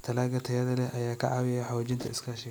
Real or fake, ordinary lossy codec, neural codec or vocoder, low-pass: real; none; none; none